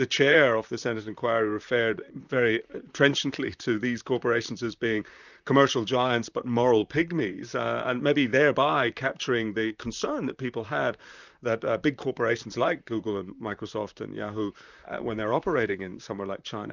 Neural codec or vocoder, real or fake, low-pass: vocoder, 44.1 kHz, 128 mel bands, Pupu-Vocoder; fake; 7.2 kHz